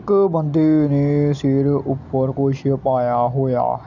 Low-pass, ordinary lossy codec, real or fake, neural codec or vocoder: 7.2 kHz; none; real; none